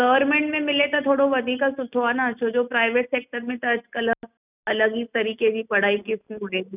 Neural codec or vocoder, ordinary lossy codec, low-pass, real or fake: none; none; 3.6 kHz; real